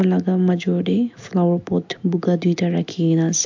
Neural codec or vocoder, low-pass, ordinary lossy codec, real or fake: none; 7.2 kHz; MP3, 48 kbps; real